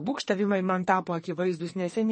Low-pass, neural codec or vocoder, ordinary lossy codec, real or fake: 10.8 kHz; codec, 44.1 kHz, 2.6 kbps, SNAC; MP3, 32 kbps; fake